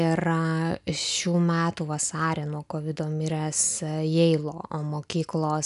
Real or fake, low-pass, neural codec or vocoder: real; 10.8 kHz; none